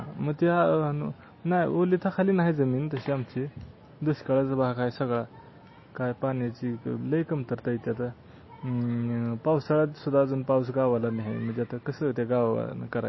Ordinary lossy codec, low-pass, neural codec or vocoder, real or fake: MP3, 24 kbps; 7.2 kHz; none; real